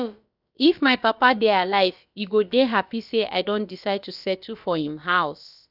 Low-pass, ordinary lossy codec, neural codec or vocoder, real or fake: 5.4 kHz; none; codec, 16 kHz, about 1 kbps, DyCAST, with the encoder's durations; fake